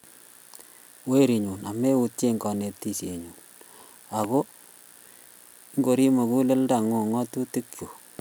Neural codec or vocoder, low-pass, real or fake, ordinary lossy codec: none; none; real; none